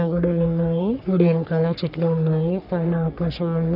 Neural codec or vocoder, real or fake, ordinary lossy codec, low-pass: codec, 44.1 kHz, 3.4 kbps, Pupu-Codec; fake; none; 5.4 kHz